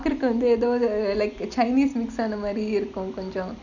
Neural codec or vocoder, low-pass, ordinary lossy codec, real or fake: none; 7.2 kHz; none; real